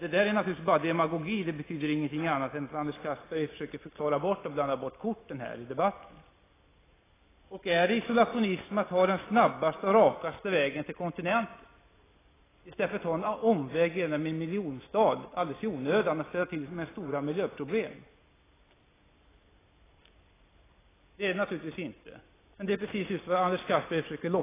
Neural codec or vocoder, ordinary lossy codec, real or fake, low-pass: none; AAC, 16 kbps; real; 3.6 kHz